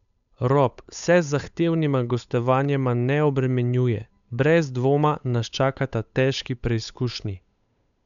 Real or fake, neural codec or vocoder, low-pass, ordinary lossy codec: fake; codec, 16 kHz, 8 kbps, FunCodec, trained on Chinese and English, 25 frames a second; 7.2 kHz; none